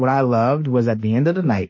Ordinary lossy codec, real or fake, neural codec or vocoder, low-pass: MP3, 32 kbps; fake; autoencoder, 48 kHz, 32 numbers a frame, DAC-VAE, trained on Japanese speech; 7.2 kHz